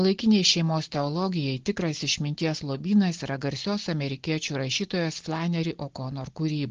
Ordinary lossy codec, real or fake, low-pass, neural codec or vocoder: Opus, 16 kbps; real; 7.2 kHz; none